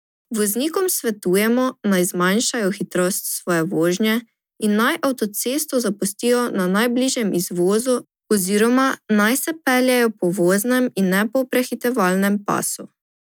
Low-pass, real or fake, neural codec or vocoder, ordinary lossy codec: none; real; none; none